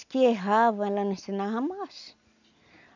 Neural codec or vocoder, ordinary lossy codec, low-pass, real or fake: none; none; 7.2 kHz; real